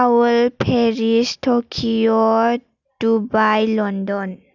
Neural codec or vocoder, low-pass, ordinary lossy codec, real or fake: none; 7.2 kHz; none; real